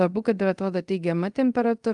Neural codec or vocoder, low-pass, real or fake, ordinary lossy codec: codec, 24 kHz, 0.5 kbps, DualCodec; 10.8 kHz; fake; Opus, 32 kbps